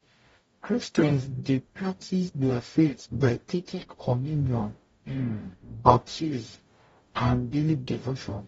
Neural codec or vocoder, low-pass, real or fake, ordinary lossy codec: codec, 44.1 kHz, 0.9 kbps, DAC; 19.8 kHz; fake; AAC, 24 kbps